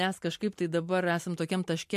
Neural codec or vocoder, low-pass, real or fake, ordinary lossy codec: none; 14.4 kHz; real; MP3, 64 kbps